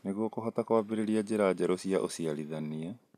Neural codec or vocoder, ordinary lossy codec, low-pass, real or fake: none; none; 14.4 kHz; real